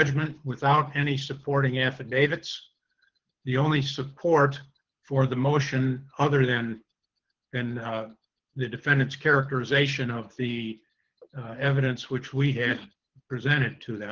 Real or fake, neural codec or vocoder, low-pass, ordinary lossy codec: fake; codec, 24 kHz, 6 kbps, HILCodec; 7.2 kHz; Opus, 16 kbps